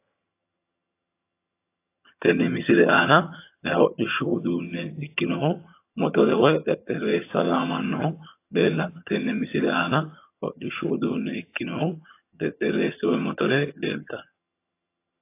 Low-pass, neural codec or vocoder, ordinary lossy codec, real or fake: 3.6 kHz; vocoder, 22.05 kHz, 80 mel bands, HiFi-GAN; AAC, 24 kbps; fake